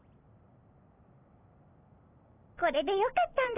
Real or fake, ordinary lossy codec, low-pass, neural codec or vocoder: real; AAC, 16 kbps; 3.6 kHz; none